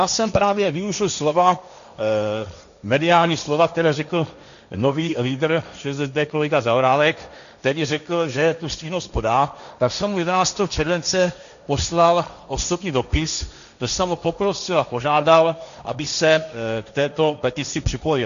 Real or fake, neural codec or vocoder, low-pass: fake; codec, 16 kHz, 1.1 kbps, Voila-Tokenizer; 7.2 kHz